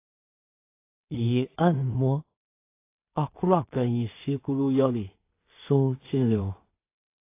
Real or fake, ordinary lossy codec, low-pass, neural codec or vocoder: fake; AAC, 24 kbps; 3.6 kHz; codec, 16 kHz in and 24 kHz out, 0.4 kbps, LongCat-Audio-Codec, two codebook decoder